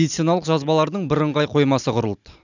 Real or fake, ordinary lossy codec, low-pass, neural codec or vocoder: real; none; 7.2 kHz; none